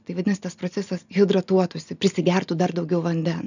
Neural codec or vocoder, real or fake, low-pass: none; real; 7.2 kHz